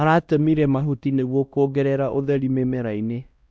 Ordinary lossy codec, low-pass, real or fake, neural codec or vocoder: none; none; fake; codec, 16 kHz, 1 kbps, X-Codec, WavLM features, trained on Multilingual LibriSpeech